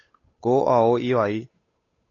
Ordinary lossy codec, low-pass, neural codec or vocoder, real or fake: AAC, 32 kbps; 7.2 kHz; codec, 16 kHz, 8 kbps, FunCodec, trained on Chinese and English, 25 frames a second; fake